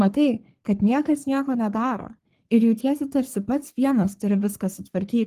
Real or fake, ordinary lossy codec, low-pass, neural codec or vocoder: fake; Opus, 24 kbps; 14.4 kHz; codec, 44.1 kHz, 3.4 kbps, Pupu-Codec